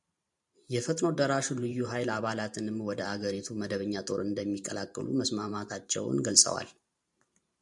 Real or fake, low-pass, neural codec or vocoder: fake; 10.8 kHz; vocoder, 44.1 kHz, 128 mel bands every 512 samples, BigVGAN v2